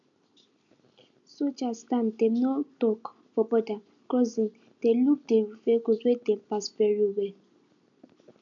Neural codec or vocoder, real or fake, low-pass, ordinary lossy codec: none; real; 7.2 kHz; none